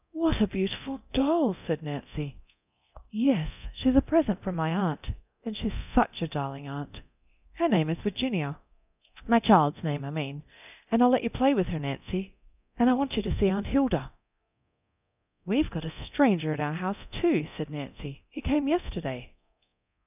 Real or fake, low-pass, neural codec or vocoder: fake; 3.6 kHz; codec, 24 kHz, 0.9 kbps, DualCodec